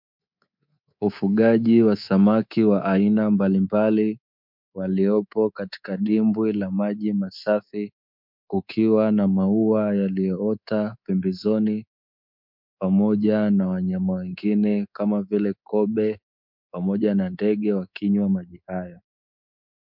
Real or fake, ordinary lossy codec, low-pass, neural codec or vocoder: fake; MP3, 48 kbps; 5.4 kHz; codec, 24 kHz, 3.1 kbps, DualCodec